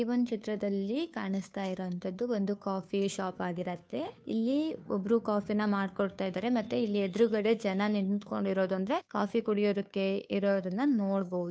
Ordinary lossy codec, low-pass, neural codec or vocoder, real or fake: none; none; codec, 16 kHz, 2 kbps, FunCodec, trained on Chinese and English, 25 frames a second; fake